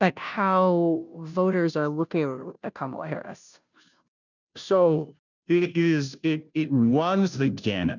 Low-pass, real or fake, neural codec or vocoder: 7.2 kHz; fake; codec, 16 kHz, 0.5 kbps, FunCodec, trained on Chinese and English, 25 frames a second